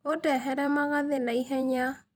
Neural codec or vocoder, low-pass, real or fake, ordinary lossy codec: vocoder, 44.1 kHz, 128 mel bands every 256 samples, BigVGAN v2; none; fake; none